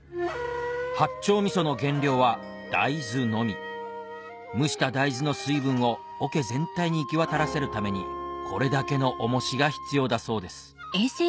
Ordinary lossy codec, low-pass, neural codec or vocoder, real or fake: none; none; none; real